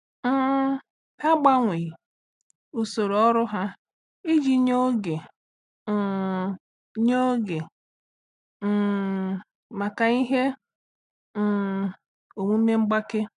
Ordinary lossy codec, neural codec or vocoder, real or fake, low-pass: none; none; real; 10.8 kHz